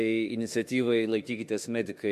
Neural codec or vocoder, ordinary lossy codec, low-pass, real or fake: autoencoder, 48 kHz, 32 numbers a frame, DAC-VAE, trained on Japanese speech; MP3, 64 kbps; 14.4 kHz; fake